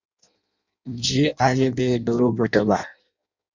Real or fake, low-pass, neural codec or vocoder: fake; 7.2 kHz; codec, 16 kHz in and 24 kHz out, 0.6 kbps, FireRedTTS-2 codec